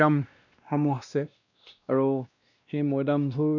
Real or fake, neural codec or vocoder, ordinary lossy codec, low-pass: fake; codec, 16 kHz, 1 kbps, X-Codec, WavLM features, trained on Multilingual LibriSpeech; none; 7.2 kHz